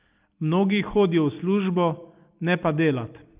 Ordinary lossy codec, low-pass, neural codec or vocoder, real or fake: Opus, 24 kbps; 3.6 kHz; none; real